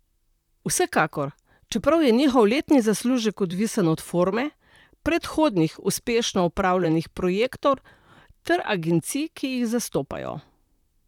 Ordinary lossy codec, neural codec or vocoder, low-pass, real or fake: none; vocoder, 44.1 kHz, 128 mel bands, Pupu-Vocoder; 19.8 kHz; fake